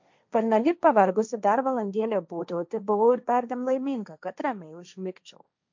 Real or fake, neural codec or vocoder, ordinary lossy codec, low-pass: fake; codec, 16 kHz, 1.1 kbps, Voila-Tokenizer; MP3, 64 kbps; 7.2 kHz